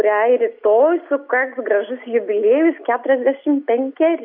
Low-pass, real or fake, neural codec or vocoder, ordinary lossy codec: 5.4 kHz; real; none; MP3, 48 kbps